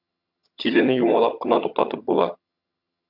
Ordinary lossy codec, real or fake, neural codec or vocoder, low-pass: MP3, 48 kbps; fake; vocoder, 22.05 kHz, 80 mel bands, HiFi-GAN; 5.4 kHz